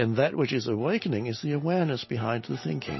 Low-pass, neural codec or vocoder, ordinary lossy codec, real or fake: 7.2 kHz; none; MP3, 24 kbps; real